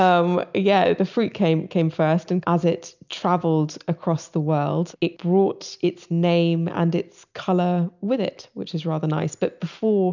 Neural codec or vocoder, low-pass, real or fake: none; 7.2 kHz; real